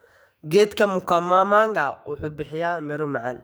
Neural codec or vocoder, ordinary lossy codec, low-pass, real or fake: codec, 44.1 kHz, 2.6 kbps, SNAC; none; none; fake